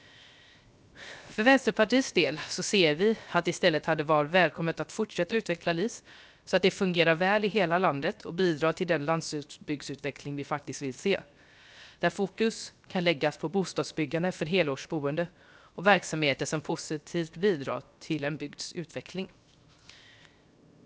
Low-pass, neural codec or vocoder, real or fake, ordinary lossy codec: none; codec, 16 kHz, 0.7 kbps, FocalCodec; fake; none